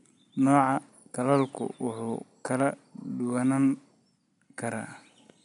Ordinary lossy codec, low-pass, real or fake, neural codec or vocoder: none; 10.8 kHz; real; none